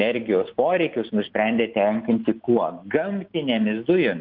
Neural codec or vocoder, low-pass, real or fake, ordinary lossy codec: none; 5.4 kHz; real; Opus, 24 kbps